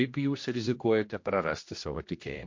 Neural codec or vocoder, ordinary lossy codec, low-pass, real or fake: codec, 16 kHz, 1 kbps, X-Codec, HuBERT features, trained on general audio; MP3, 48 kbps; 7.2 kHz; fake